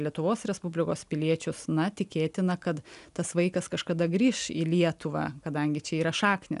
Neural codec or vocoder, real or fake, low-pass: none; real; 10.8 kHz